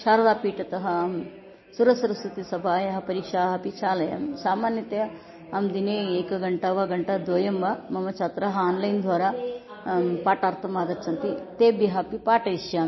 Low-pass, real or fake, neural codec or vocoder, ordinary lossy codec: 7.2 kHz; real; none; MP3, 24 kbps